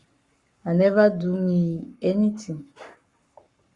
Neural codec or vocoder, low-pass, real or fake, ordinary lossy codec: codec, 44.1 kHz, 7.8 kbps, Pupu-Codec; 10.8 kHz; fake; Opus, 64 kbps